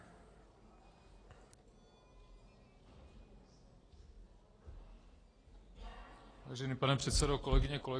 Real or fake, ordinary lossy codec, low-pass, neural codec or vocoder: real; AAC, 32 kbps; 9.9 kHz; none